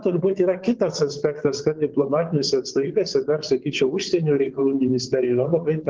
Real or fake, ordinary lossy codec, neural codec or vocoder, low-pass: fake; Opus, 16 kbps; vocoder, 22.05 kHz, 80 mel bands, WaveNeXt; 7.2 kHz